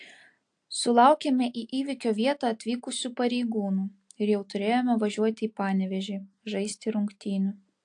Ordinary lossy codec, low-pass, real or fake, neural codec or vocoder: AAC, 64 kbps; 9.9 kHz; real; none